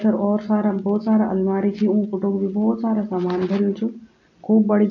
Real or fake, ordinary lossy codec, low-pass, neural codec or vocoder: real; AAC, 48 kbps; 7.2 kHz; none